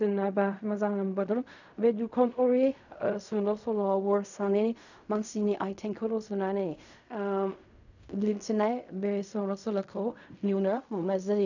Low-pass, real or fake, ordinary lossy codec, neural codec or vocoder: 7.2 kHz; fake; none; codec, 16 kHz in and 24 kHz out, 0.4 kbps, LongCat-Audio-Codec, fine tuned four codebook decoder